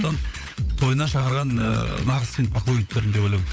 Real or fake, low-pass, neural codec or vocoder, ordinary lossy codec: fake; none; codec, 16 kHz, 16 kbps, FunCodec, trained on LibriTTS, 50 frames a second; none